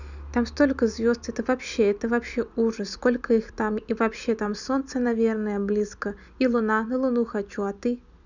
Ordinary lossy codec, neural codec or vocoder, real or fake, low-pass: none; none; real; 7.2 kHz